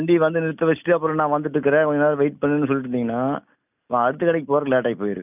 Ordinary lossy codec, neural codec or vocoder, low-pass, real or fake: none; none; 3.6 kHz; real